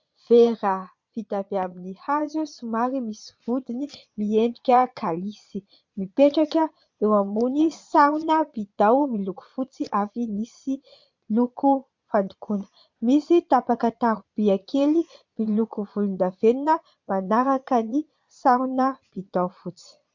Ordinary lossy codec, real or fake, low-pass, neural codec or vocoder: MP3, 64 kbps; fake; 7.2 kHz; vocoder, 22.05 kHz, 80 mel bands, Vocos